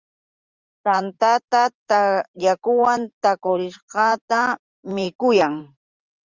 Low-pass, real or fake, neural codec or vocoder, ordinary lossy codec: 7.2 kHz; fake; vocoder, 44.1 kHz, 128 mel bands every 512 samples, BigVGAN v2; Opus, 32 kbps